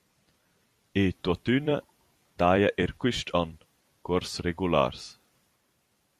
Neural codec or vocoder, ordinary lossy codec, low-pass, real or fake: vocoder, 44.1 kHz, 128 mel bands every 256 samples, BigVGAN v2; Opus, 64 kbps; 14.4 kHz; fake